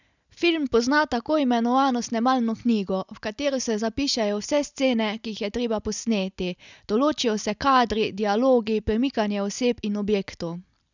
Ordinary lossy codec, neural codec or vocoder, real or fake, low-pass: none; none; real; 7.2 kHz